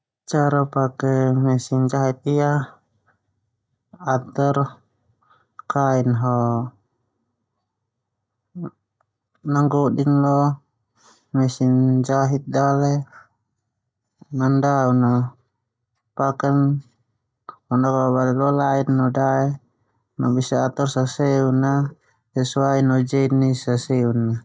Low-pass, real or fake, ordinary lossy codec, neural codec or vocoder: none; real; none; none